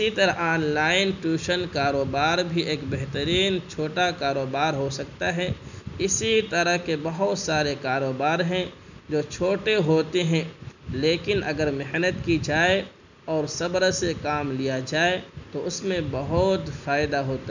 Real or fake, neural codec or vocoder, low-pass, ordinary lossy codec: real; none; 7.2 kHz; none